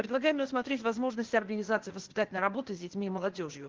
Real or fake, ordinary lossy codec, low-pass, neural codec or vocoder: fake; Opus, 16 kbps; 7.2 kHz; codec, 16 kHz, 0.8 kbps, ZipCodec